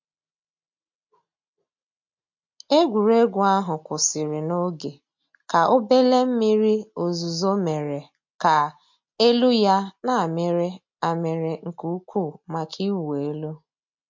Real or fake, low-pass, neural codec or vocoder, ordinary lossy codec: real; 7.2 kHz; none; MP3, 48 kbps